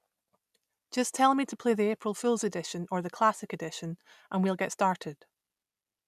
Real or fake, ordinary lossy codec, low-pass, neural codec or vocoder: real; none; 14.4 kHz; none